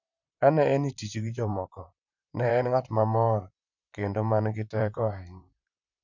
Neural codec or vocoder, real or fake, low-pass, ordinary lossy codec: vocoder, 44.1 kHz, 128 mel bands, Pupu-Vocoder; fake; 7.2 kHz; none